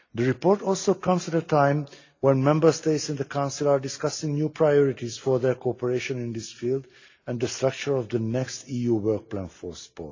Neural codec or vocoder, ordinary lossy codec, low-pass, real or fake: none; AAC, 48 kbps; 7.2 kHz; real